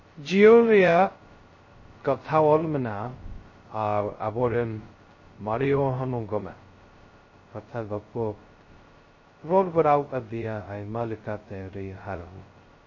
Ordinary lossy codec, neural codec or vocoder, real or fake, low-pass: MP3, 32 kbps; codec, 16 kHz, 0.2 kbps, FocalCodec; fake; 7.2 kHz